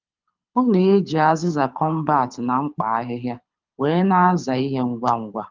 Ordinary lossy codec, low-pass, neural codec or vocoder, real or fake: Opus, 32 kbps; 7.2 kHz; codec, 24 kHz, 6 kbps, HILCodec; fake